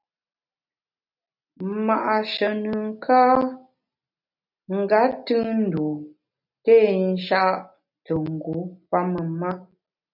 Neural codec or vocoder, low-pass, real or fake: none; 5.4 kHz; real